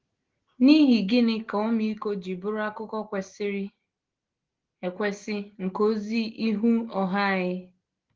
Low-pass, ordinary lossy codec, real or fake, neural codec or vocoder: 7.2 kHz; Opus, 16 kbps; real; none